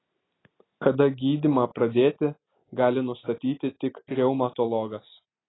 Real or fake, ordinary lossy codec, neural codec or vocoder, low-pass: real; AAC, 16 kbps; none; 7.2 kHz